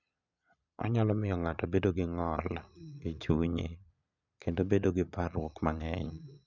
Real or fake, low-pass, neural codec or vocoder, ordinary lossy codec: fake; 7.2 kHz; vocoder, 22.05 kHz, 80 mel bands, Vocos; none